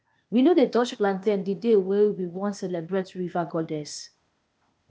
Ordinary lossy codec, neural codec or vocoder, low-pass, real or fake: none; codec, 16 kHz, 0.8 kbps, ZipCodec; none; fake